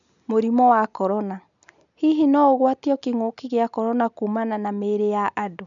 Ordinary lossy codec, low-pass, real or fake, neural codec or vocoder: none; 7.2 kHz; real; none